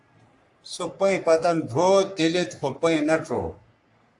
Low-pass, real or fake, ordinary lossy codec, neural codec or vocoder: 10.8 kHz; fake; AAC, 64 kbps; codec, 44.1 kHz, 3.4 kbps, Pupu-Codec